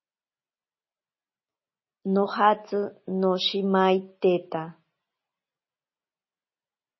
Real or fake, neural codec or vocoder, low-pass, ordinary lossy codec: real; none; 7.2 kHz; MP3, 24 kbps